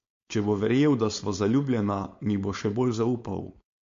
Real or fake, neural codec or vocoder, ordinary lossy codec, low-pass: fake; codec, 16 kHz, 4.8 kbps, FACodec; MP3, 48 kbps; 7.2 kHz